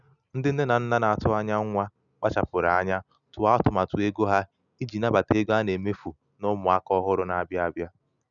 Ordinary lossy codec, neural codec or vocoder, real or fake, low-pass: none; none; real; 7.2 kHz